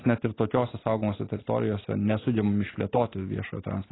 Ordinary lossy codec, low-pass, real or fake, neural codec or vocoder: AAC, 16 kbps; 7.2 kHz; real; none